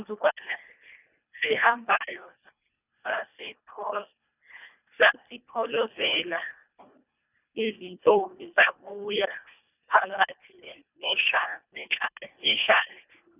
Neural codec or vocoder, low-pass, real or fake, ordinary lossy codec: codec, 24 kHz, 1.5 kbps, HILCodec; 3.6 kHz; fake; none